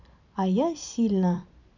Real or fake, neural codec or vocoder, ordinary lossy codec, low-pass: fake; vocoder, 44.1 kHz, 128 mel bands every 512 samples, BigVGAN v2; none; 7.2 kHz